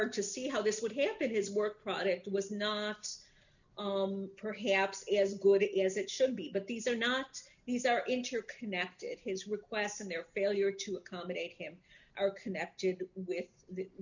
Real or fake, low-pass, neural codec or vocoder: real; 7.2 kHz; none